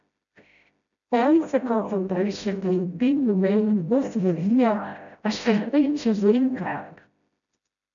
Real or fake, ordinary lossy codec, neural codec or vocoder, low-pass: fake; MP3, 96 kbps; codec, 16 kHz, 0.5 kbps, FreqCodec, smaller model; 7.2 kHz